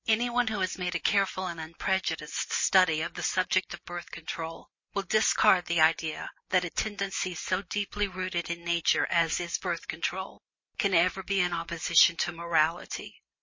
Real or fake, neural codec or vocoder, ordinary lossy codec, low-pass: real; none; MP3, 32 kbps; 7.2 kHz